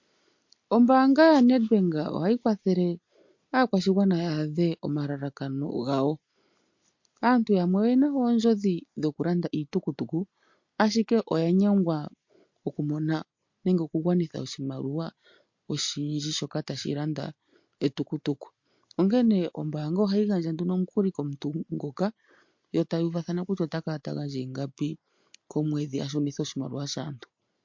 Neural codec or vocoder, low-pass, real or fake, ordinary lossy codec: none; 7.2 kHz; real; MP3, 48 kbps